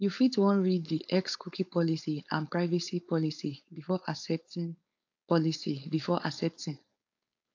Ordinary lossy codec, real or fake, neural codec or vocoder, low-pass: AAC, 48 kbps; fake; codec, 16 kHz, 4.8 kbps, FACodec; 7.2 kHz